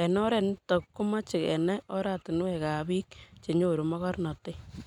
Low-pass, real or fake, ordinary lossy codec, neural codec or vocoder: 19.8 kHz; real; none; none